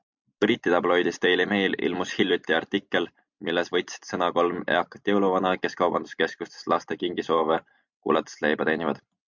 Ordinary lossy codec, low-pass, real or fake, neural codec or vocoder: MP3, 64 kbps; 7.2 kHz; real; none